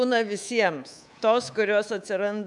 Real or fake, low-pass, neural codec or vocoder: fake; 10.8 kHz; codec, 24 kHz, 3.1 kbps, DualCodec